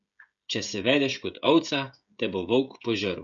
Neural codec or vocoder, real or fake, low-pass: codec, 16 kHz, 16 kbps, FreqCodec, smaller model; fake; 7.2 kHz